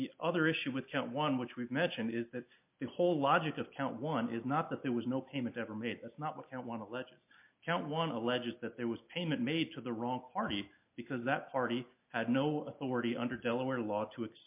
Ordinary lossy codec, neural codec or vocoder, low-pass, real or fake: MP3, 32 kbps; none; 3.6 kHz; real